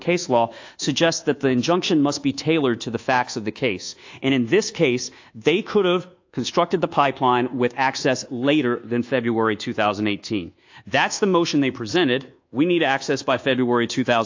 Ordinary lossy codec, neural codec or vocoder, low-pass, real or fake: AAC, 48 kbps; codec, 24 kHz, 1.2 kbps, DualCodec; 7.2 kHz; fake